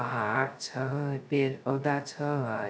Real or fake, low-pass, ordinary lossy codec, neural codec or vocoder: fake; none; none; codec, 16 kHz, 0.2 kbps, FocalCodec